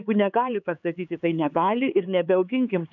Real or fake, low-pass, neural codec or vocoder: fake; 7.2 kHz; codec, 16 kHz, 4 kbps, X-Codec, HuBERT features, trained on LibriSpeech